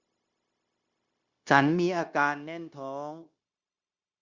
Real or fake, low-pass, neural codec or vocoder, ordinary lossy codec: fake; 7.2 kHz; codec, 16 kHz, 0.9 kbps, LongCat-Audio-Codec; Opus, 64 kbps